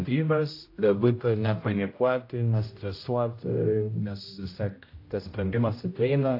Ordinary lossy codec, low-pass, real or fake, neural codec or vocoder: AAC, 32 kbps; 5.4 kHz; fake; codec, 16 kHz, 0.5 kbps, X-Codec, HuBERT features, trained on general audio